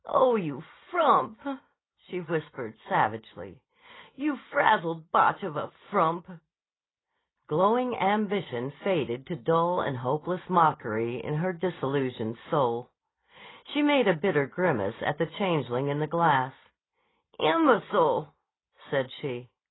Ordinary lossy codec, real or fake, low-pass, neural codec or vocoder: AAC, 16 kbps; real; 7.2 kHz; none